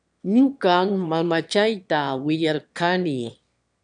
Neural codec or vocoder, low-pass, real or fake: autoencoder, 22.05 kHz, a latent of 192 numbers a frame, VITS, trained on one speaker; 9.9 kHz; fake